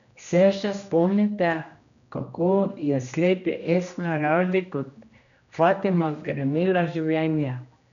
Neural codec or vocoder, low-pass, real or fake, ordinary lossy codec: codec, 16 kHz, 1 kbps, X-Codec, HuBERT features, trained on general audio; 7.2 kHz; fake; none